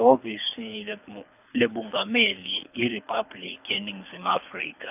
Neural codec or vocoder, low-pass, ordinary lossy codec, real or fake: codec, 24 kHz, 3 kbps, HILCodec; 3.6 kHz; none; fake